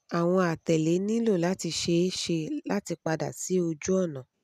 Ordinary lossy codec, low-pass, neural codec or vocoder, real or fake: none; 14.4 kHz; none; real